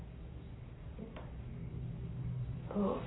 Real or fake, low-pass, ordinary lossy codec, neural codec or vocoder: fake; 7.2 kHz; AAC, 16 kbps; codec, 44.1 kHz, 7.8 kbps, Pupu-Codec